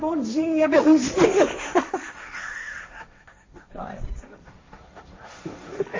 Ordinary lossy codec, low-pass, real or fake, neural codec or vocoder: MP3, 32 kbps; 7.2 kHz; fake; codec, 16 kHz, 1.1 kbps, Voila-Tokenizer